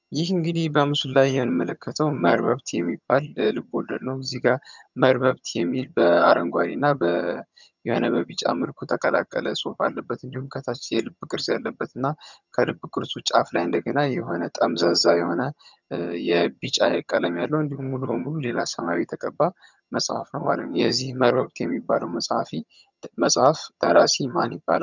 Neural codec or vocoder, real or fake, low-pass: vocoder, 22.05 kHz, 80 mel bands, HiFi-GAN; fake; 7.2 kHz